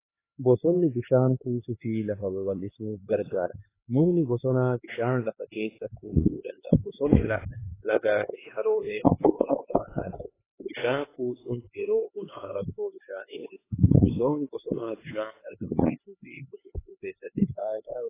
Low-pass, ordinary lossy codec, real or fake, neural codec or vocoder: 3.6 kHz; AAC, 16 kbps; fake; codec, 16 kHz, 4 kbps, X-Codec, HuBERT features, trained on LibriSpeech